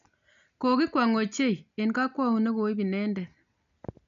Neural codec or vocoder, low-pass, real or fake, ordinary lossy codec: none; 7.2 kHz; real; none